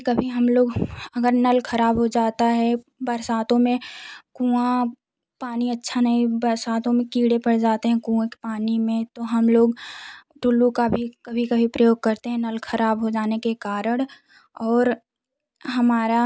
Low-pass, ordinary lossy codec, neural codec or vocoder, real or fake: none; none; none; real